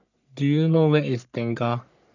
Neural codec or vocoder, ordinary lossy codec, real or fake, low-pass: codec, 44.1 kHz, 3.4 kbps, Pupu-Codec; none; fake; 7.2 kHz